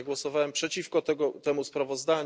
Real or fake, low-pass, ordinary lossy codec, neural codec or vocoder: real; none; none; none